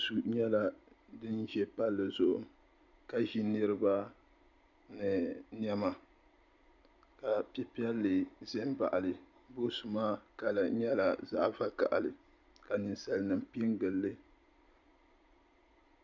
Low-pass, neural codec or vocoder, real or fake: 7.2 kHz; none; real